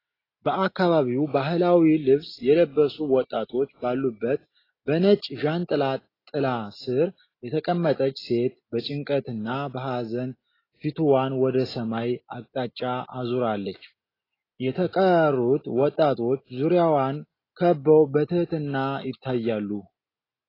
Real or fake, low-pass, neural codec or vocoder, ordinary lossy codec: real; 5.4 kHz; none; AAC, 24 kbps